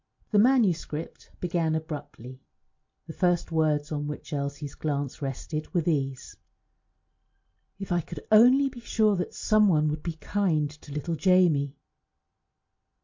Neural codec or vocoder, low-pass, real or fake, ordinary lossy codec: none; 7.2 kHz; real; MP3, 48 kbps